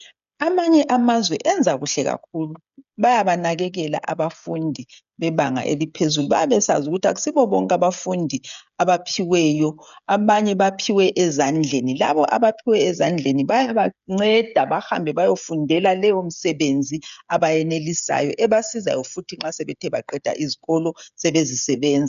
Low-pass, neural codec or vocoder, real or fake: 7.2 kHz; codec, 16 kHz, 16 kbps, FreqCodec, smaller model; fake